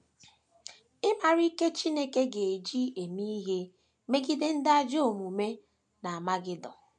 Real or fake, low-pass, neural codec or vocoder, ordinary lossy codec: real; 9.9 kHz; none; MP3, 64 kbps